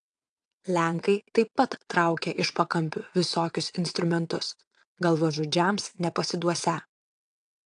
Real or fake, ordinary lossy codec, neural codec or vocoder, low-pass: fake; AAC, 64 kbps; vocoder, 22.05 kHz, 80 mel bands, WaveNeXt; 9.9 kHz